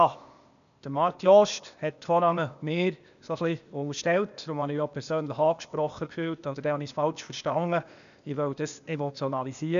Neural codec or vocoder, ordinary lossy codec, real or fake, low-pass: codec, 16 kHz, 0.8 kbps, ZipCodec; none; fake; 7.2 kHz